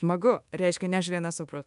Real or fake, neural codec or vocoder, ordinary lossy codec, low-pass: fake; codec, 24 kHz, 1.2 kbps, DualCodec; MP3, 96 kbps; 10.8 kHz